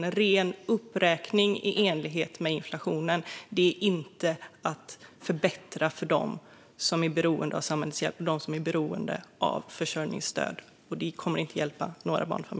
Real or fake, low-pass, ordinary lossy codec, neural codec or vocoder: real; none; none; none